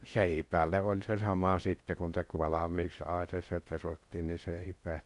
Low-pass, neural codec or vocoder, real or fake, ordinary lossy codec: 10.8 kHz; codec, 16 kHz in and 24 kHz out, 0.6 kbps, FocalCodec, streaming, 4096 codes; fake; none